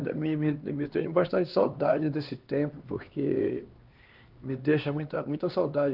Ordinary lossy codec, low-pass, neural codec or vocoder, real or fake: Opus, 32 kbps; 5.4 kHz; codec, 16 kHz, 2 kbps, X-Codec, HuBERT features, trained on LibriSpeech; fake